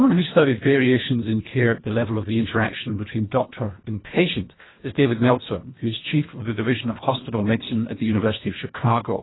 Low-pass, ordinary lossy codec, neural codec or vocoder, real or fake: 7.2 kHz; AAC, 16 kbps; codec, 24 kHz, 1.5 kbps, HILCodec; fake